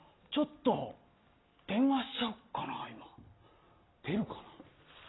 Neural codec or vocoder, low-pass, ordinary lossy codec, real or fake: none; 7.2 kHz; AAC, 16 kbps; real